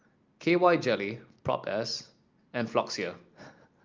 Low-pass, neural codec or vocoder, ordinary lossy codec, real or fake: 7.2 kHz; none; Opus, 24 kbps; real